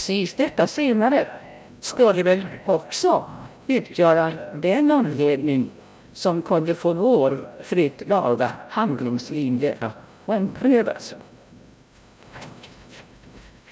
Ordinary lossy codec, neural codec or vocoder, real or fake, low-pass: none; codec, 16 kHz, 0.5 kbps, FreqCodec, larger model; fake; none